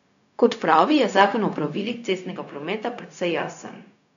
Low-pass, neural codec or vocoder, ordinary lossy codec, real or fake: 7.2 kHz; codec, 16 kHz, 0.4 kbps, LongCat-Audio-Codec; none; fake